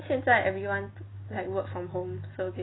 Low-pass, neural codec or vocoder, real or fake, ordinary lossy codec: 7.2 kHz; none; real; AAC, 16 kbps